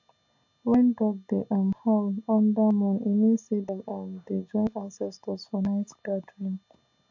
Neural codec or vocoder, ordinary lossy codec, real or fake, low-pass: none; AAC, 48 kbps; real; 7.2 kHz